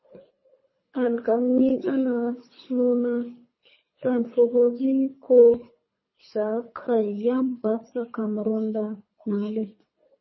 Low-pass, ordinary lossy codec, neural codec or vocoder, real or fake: 7.2 kHz; MP3, 24 kbps; codec, 24 kHz, 3 kbps, HILCodec; fake